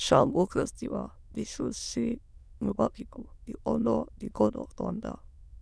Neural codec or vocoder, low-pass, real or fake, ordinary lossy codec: autoencoder, 22.05 kHz, a latent of 192 numbers a frame, VITS, trained on many speakers; none; fake; none